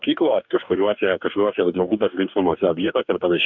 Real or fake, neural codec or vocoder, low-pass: fake; codec, 44.1 kHz, 2.6 kbps, DAC; 7.2 kHz